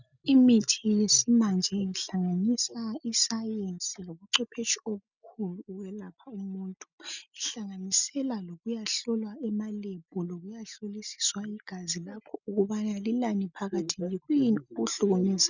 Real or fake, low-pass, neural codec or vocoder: real; 7.2 kHz; none